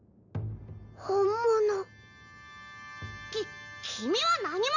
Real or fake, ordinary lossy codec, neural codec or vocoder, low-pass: real; none; none; 7.2 kHz